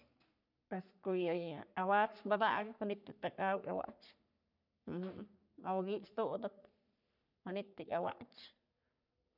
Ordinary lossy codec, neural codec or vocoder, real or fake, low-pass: none; codec, 44.1 kHz, 3.4 kbps, Pupu-Codec; fake; 5.4 kHz